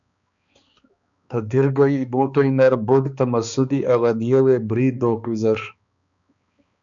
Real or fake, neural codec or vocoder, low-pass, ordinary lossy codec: fake; codec, 16 kHz, 2 kbps, X-Codec, HuBERT features, trained on balanced general audio; 7.2 kHz; MP3, 96 kbps